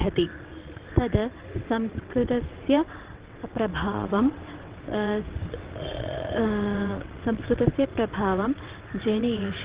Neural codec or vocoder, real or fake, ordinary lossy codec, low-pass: vocoder, 22.05 kHz, 80 mel bands, WaveNeXt; fake; Opus, 16 kbps; 3.6 kHz